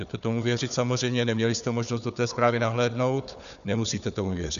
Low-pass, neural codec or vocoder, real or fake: 7.2 kHz; codec, 16 kHz, 4 kbps, FunCodec, trained on Chinese and English, 50 frames a second; fake